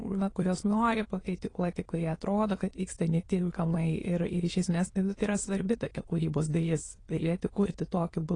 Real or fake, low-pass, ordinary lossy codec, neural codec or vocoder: fake; 9.9 kHz; AAC, 32 kbps; autoencoder, 22.05 kHz, a latent of 192 numbers a frame, VITS, trained on many speakers